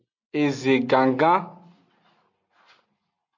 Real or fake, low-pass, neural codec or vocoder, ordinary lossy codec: real; 7.2 kHz; none; MP3, 64 kbps